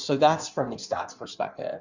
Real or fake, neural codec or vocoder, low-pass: fake; codec, 16 kHz in and 24 kHz out, 1.1 kbps, FireRedTTS-2 codec; 7.2 kHz